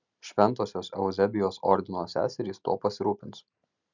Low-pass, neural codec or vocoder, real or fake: 7.2 kHz; none; real